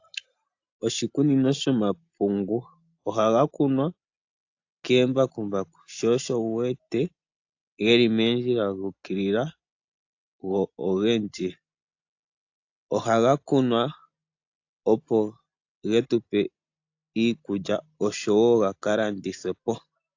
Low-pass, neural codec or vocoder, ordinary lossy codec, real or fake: 7.2 kHz; none; AAC, 48 kbps; real